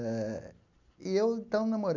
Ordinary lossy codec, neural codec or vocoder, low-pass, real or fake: none; none; 7.2 kHz; real